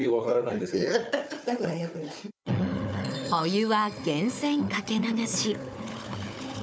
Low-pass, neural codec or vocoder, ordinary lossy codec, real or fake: none; codec, 16 kHz, 4 kbps, FunCodec, trained on Chinese and English, 50 frames a second; none; fake